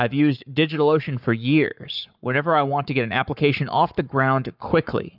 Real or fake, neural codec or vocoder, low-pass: fake; codec, 44.1 kHz, 7.8 kbps, Pupu-Codec; 5.4 kHz